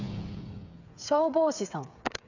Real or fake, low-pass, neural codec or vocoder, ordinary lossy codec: fake; 7.2 kHz; codec, 16 kHz, 8 kbps, FreqCodec, smaller model; none